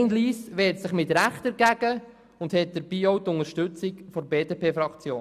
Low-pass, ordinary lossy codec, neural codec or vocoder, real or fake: 14.4 kHz; none; none; real